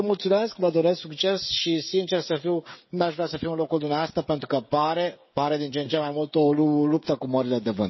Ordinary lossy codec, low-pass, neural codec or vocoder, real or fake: MP3, 24 kbps; 7.2 kHz; codec, 16 kHz, 16 kbps, FreqCodec, smaller model; fake